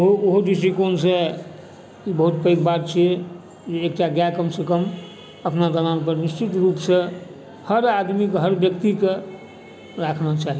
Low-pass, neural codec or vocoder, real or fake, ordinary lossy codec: none; none; real; none